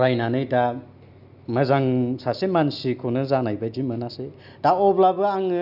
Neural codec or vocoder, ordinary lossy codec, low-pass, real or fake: none; none; 5.4 kHz; real